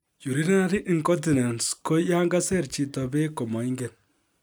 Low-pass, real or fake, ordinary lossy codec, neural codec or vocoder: none; real; none; none